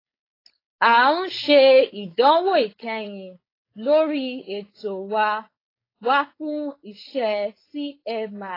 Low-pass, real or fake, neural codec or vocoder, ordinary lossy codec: 5.4 kHz; fake; codec, 16 kHz, 4.8 kbps, FACodec; AAC, 24 kbps